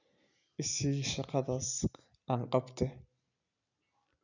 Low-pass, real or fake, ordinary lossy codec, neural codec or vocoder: 7.2 kHz; real; none; none